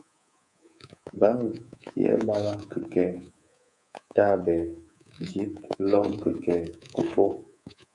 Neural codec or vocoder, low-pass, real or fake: codec, 24 kHz, 3.1 kbps, DualCodec; 10.8 kHz; fake